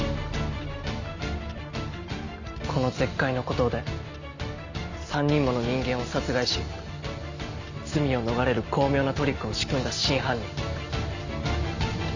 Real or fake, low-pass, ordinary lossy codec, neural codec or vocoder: real; 7.2 kHz; Opus, 64 kbps; none